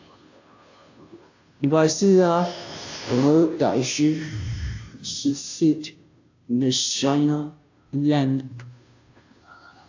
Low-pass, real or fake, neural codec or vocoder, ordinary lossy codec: 7.2 kHz; fake; codec, 16 kHz, 0.5 kbps, FunCodec, trained on Chinese and English, 25 frames a second; AAC, 48 kbps